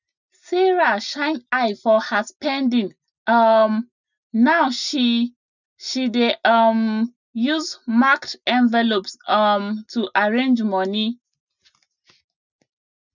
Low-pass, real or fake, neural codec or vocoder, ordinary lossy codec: 7.2 kHz; real; none; none